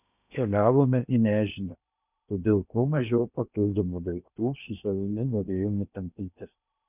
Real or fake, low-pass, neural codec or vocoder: fake; 3.6 kHz; codec, 16 kHz in and 24 kHz out, 0.8 kbps, FocalCodec, streaming, 65536 codes